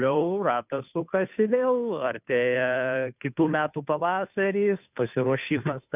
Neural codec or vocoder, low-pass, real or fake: codec, 16 kHz, 2 kbps, FunCodec, trained on Chinese and English, 25 frames a second; 3.6 kHz; fake